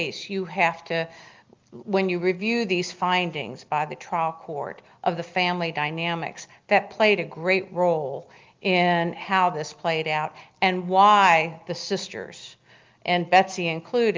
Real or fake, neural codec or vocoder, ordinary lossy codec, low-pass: real; none; Opus, 32 kbps; 7.2 kHz